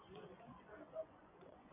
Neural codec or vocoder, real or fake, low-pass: vocoder, 24 kHz, 100 mel bands, Vocos; fake; 3.6 kHz